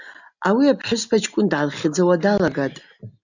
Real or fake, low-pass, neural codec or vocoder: real; 7.2 kHz; none